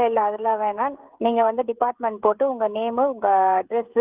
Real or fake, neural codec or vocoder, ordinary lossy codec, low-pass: fake; codec, 16 kHz, 16 kbps, FreqCodec, smaller model; Opus, 24 kbps; 3.6 kHz